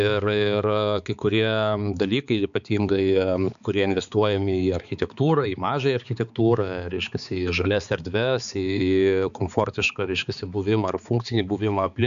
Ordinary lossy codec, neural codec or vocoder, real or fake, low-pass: MP3, 96 kbps; codec, 16 kHz, 4 kbps, X-Codec, HuBERT features, trained on balanced general audio; fake; 7.2 kHz